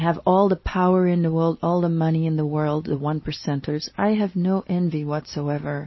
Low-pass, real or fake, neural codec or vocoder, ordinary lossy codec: 7.2 kHz; real; none; MP3, 24 kbps